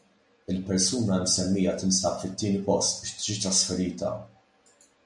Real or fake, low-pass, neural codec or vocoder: real; 10.8 kHz; none